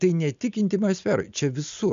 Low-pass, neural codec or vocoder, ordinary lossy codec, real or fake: 7.2 kHz; none; MP3, 64 kbps; real